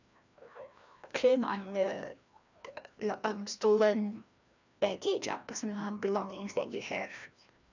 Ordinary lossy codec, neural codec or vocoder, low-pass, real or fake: none; codec, 16 kHz, 1 kbps, FreqCodec, larger model; 7.2 kHz; fake